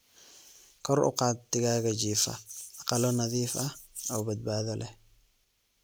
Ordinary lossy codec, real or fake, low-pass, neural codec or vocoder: none; real; none; none